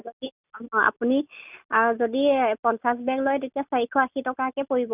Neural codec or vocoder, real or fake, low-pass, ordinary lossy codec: none; real; 3.6 kHz; none